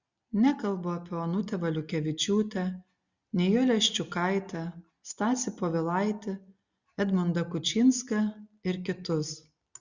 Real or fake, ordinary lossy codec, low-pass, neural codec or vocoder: real; Opus, 64 kbps; 7.2 kHz; none